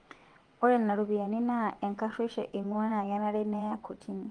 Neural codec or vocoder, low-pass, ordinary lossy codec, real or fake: vocoder, 22.05 kHz, 80 mel bands, WaveNeXt; 9.9 kHz; Opus, 32 kbps; fake